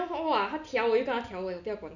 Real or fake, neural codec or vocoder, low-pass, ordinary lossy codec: real; none; 7.2 kHz; none